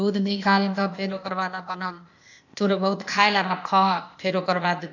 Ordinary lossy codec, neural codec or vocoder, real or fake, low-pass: none; codec, 16 kHz, 0.8 kbps, ZipCodec; fake; 7.2 kHz